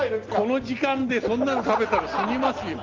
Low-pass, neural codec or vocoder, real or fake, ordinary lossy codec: 7.2 kHz; none; real; Opus, 32 kbps